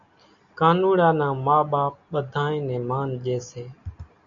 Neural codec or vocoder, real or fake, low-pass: none; real; 7.2 kHz